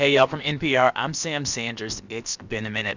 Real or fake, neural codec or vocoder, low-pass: fake; codec, 16 kHz, about 1 kbps, DyCAST, with the encoder's durations; 7.2 kHz